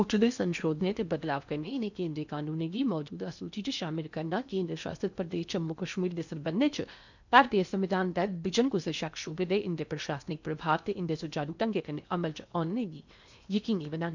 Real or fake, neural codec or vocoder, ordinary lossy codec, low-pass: fake; codec, 16 kHz in and 24 kHz out, 0.8 kbps, FocalCodec, streaming, 65536 codes; none; 7.2 kHz